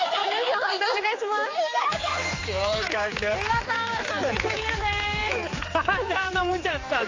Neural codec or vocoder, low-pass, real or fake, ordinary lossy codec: codec, 16 kHz, 4 kbps, X-Codec, HuBERT features, trained on general audio; 7.2 kHz; fake; AAC, 32 kbps